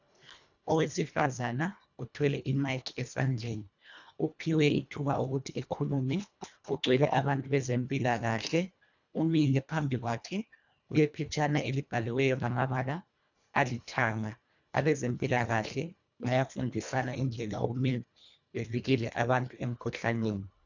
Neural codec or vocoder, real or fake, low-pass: codec, 24 kHz, 1.5 kbps, HILCodec; fake; 7.2 kHz